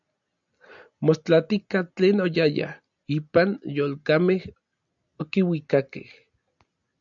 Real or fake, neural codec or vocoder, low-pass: real; none; 7.2 kHz